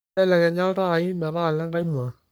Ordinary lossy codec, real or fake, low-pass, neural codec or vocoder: none; fake; none; codec, 44.1 kHz, 3.4 kbps, Pupu-Codec